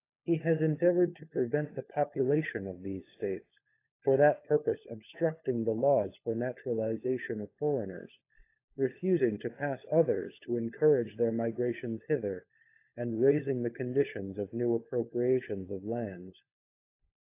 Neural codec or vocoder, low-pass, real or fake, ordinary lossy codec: codec, 16 kHz, 16 kbps, FunCodec, trained on LibriTTS, 50 frames a second; 3.6 kHz; fake; AAC, 24 kbps